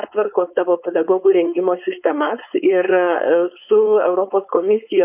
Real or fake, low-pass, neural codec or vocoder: fake; 3.6 kHz; codec, 16 kHz, 4.8 kbps, FACodec